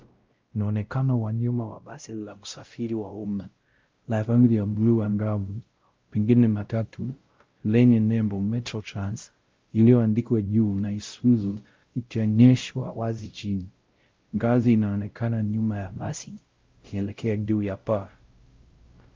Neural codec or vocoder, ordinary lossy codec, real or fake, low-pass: codec, 16 kHz, 0.5 kbps, X-Codec, WavLM features, trained on Multilingual LibriSpeech; Opus, 24 kbps; fake; 7.2 kHz